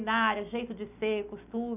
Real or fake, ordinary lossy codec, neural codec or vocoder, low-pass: real; none; none; 3.6 kHz